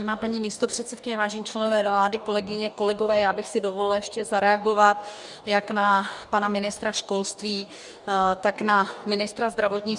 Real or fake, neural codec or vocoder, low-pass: fake; codec, 44.1 kHz, 2.6 kbps, DAC; 10.8 kHz